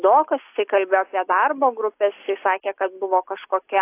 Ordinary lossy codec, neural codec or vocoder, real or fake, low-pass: AAC, 24 kbps; none; real; 3.6 kHz